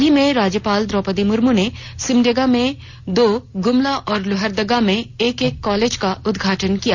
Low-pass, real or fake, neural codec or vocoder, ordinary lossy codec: 7.2 kHz; real; none; none